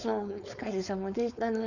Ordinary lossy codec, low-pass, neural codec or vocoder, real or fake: none; 7.2 kHz; codec, 16 kHz, 4.8 kbps, FACodec; fake